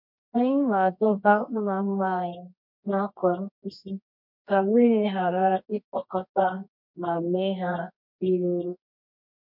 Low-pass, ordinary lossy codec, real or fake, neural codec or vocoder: 5.4 kHz; MP3, 48 kbps; fake; codec, 24 kHz, 0.9 kbps, WavTokenizer, medium music audio release